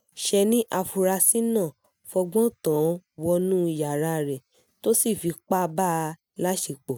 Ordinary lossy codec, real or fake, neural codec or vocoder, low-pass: none; real; none; none